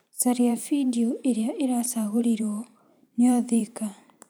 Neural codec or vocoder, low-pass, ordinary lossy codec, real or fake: vocoder, 44.1 kHz, 128 mel bands every 512 samples, BigVGAN v2; none; none; fake